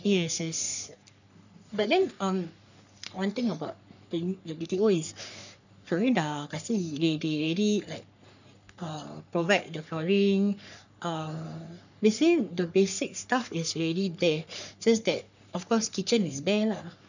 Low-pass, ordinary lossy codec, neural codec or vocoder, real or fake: 7.2 kHz; none; codec, 44.1 kHz, 3.4 kbps, Pupu-Codec; fake